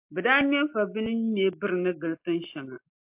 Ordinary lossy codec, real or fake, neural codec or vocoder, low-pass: MP3, 32 kbps; real; none; 3.6 kHz